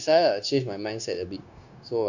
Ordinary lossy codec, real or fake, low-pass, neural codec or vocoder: none; fake; 7.2 kHz; codec, 16 kHz, 0.9 kbps, LongCat-Audio-Codec